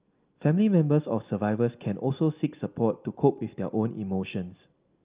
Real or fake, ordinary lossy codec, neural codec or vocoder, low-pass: real; Opus, 32 kbps; none; 3.6 kHz